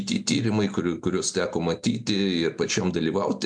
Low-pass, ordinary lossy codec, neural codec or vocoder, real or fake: 9.9 kHz; MP3, 64 kbps; none; real